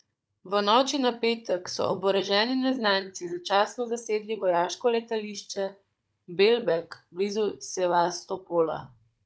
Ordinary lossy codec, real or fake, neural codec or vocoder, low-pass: none; fake; codec, 16 kHz, 16 kbps, FunCodec, trained on Chinese and English, 50 frames a second; none